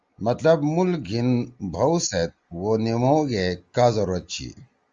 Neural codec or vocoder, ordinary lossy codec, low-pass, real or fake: none; Opus, 32 kbps; 7.2 kHz; real